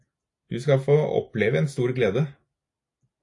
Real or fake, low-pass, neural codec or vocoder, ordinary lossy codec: real; 10.8 kHz; none; AAC, 48 kbps